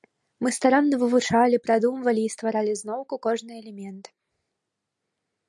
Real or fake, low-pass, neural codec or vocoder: real; 10.8 kHz; none